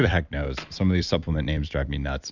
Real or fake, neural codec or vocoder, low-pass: fake; vocoder, 44.1 kHz, 128 mel bands every 256 samples, BigVGAN v2; 7.2 kHz